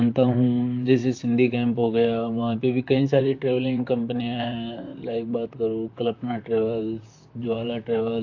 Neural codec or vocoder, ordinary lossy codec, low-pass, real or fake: vocoder, 44.1 kHz, 128 mel bands, Pupu-Vocoder; AAC, 48 kbps; 7.2 kHz; fake